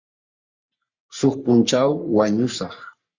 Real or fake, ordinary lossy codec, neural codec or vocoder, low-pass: fake; Opus, 64 kbps; codec, 44.1 kHz, 3.4 kbps, Pupu-Codec; 7.2 kHz